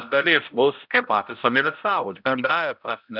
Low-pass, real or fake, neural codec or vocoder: 5.4 kHz; fake; codec, 16 kHz, 0.5 kbps, X-Codec, HuBERT features, trained on balanced general audio